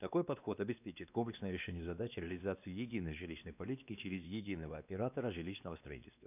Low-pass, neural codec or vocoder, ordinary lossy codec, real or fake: 3.6 kHz; codec, 16 kHz, 2 kbps, X-Codec, WavLM features, trained on Multilingual LibriSpeech; none; fake